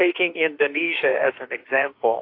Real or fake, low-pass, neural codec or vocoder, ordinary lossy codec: fake; 5.4 kHz; autoencoder, 48 kHz, 32 numbers a frame, DAC-VAE, trained on Japanese speech; AAC, 32 kbps